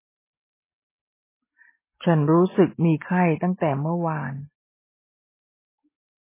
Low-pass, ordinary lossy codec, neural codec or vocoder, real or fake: 3.6 kHz; MP3, 16 kbps; none; real